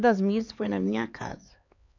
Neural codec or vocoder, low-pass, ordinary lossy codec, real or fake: codec, 16 kHz, 4 kbps, X-Codec, HuBERT features, trained on LibriSpeech; 7.2 kHz; none; fake